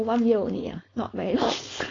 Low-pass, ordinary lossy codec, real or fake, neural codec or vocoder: 7.2 kHz; AAC, 32 kbps; fake; codec, 16 kHz, 4.8 kbps, FACodec